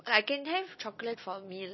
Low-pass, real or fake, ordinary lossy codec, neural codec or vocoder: 7.2 kHz; real; MP3, 24 kbps; none